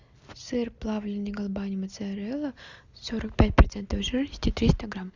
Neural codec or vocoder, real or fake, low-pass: none; real; 7.2 kHz